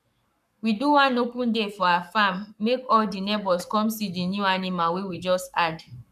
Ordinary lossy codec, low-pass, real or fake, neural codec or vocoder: none; 14.4 kHz; fake; codec, 44.1 kHz, 7.8 kbps, Pupu-Codec